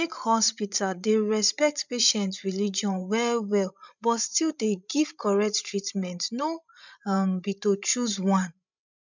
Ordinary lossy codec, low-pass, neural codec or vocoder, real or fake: none; 7.2 kHz; codec, 16 kHz, 16 kbps, FreqCodec, larger model; fake